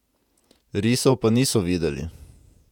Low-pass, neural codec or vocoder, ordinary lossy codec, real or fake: 19.8 kHz; vocoder, 48 kHz, 128 mel bands, Vocos; none; fake